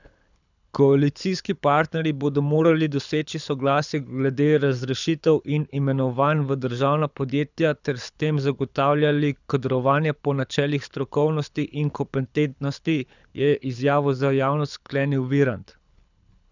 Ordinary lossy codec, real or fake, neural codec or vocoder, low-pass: none; fake; codec, 24 kHz, 6 kbps, HILCodec; 7.2 kHz